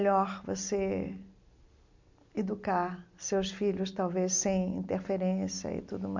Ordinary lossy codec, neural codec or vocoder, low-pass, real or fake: MP3, 64 kbps; none; 7.2 kHz; real